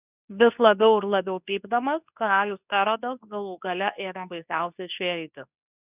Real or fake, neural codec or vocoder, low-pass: fake; codec, 24 kHz, 0.9 kbps, WavTokenizer, medium speech release version 2; 3.6 kHz